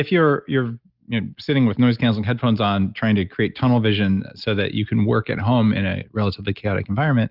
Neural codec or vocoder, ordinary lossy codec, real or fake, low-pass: none; Opus, 24 kbps; real; 5.4 kHz